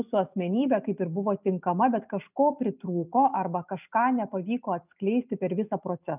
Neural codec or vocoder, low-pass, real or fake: none; 3.6 kHz; real